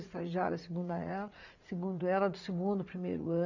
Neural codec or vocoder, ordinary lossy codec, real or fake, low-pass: vocoder, 44.1 kHz, 80 mel bands, Vocos; none; fake; 7.2 kHz